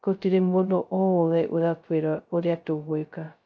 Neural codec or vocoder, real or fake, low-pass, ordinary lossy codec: codec, 16 kHz, 0.2 kbps, FocalCodec; fake; none; none